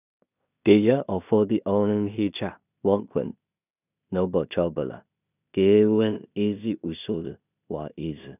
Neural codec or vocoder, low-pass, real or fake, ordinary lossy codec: codec, 16 kHz in and 24 kHz out, 0.4 kbps, LongCat-Audio-Codec, two codebook decoder; 3.6 kHz; fake; none